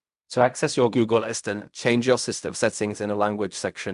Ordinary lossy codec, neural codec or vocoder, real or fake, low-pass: none; codec, 16 kHz in and 24 kHz out, 0.4 kbps, LongCat-Audio-Codec, fine tuned four codebook decoder; fake; 10.8 kHz